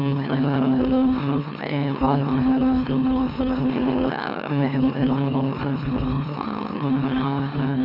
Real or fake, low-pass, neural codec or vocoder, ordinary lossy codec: fake; 5.4 kHz; autoencoder, 44.1 kHz, a latent of 192 numbers a frame, MeloTTS; none